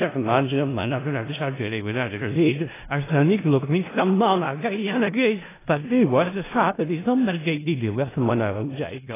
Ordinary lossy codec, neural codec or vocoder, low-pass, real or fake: AAC, 16 kbps; codec, 16 kHz in and 24 kHz out, 0.4 kbps, LongCat-Audio-Codec, four codebook decoder; 3.6 kHz; fake